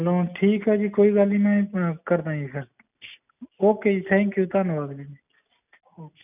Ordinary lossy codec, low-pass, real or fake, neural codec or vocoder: none; 3.6 kHz; real; none